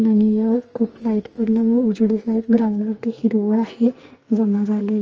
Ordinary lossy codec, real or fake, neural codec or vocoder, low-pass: Opus, 24 kbps; fake; codec, 44.1 kHz, 2.6 kbps, SNAC; 7.2 kHz